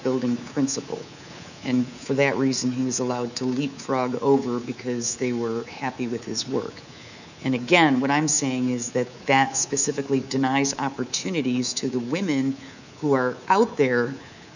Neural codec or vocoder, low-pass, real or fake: codec, 24 kHz, 3.1 kbps, DualCodec; 7.2 kHz; fake